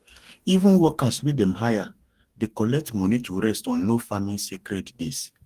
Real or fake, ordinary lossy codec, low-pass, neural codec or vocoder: fake; Opus, 32 kbps; 14.4 kHz; codec, 44.1 kHz, 2.6 kbps, DAC